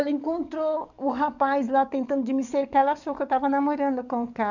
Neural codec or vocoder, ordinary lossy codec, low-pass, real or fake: codec, 44.1 kHz, 7.8 kbps, DAC; none; 7.2 kHz; fake